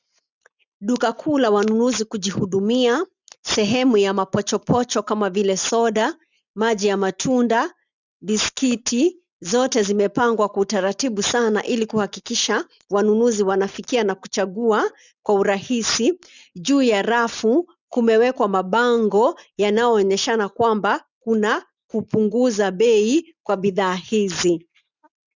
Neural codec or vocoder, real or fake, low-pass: none; real; 7.2 kHz